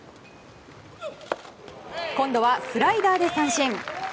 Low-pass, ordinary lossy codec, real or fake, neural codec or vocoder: none; none; real; none